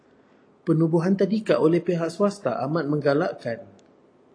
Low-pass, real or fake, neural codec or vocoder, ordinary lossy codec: 9.9 kHz; real; none; AAC, 48 kbps